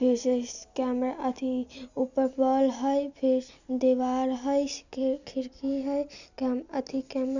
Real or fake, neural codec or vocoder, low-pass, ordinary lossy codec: real; none; 7.2 kHz; none